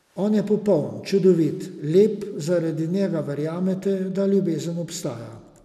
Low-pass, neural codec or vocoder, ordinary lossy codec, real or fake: 14.4 kHz; none; none; real